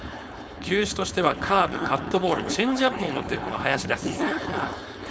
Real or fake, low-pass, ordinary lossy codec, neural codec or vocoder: fake; none; none; codec, 16 kHz, 4.8 kbps, FACodec